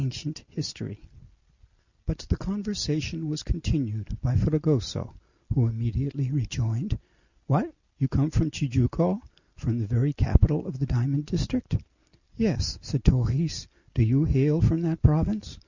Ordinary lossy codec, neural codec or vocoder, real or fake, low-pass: Opus, 64 kbps; none; real; 7.2 kHz